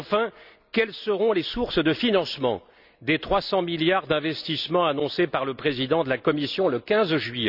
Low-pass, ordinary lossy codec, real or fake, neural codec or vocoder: 5.4 kHz; none; real; none